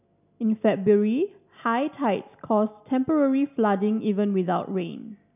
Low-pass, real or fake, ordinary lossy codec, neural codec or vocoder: 3.6 kHz; real; none; none